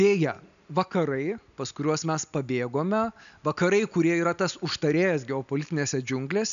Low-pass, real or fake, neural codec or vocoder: 7.2 kHz; real; none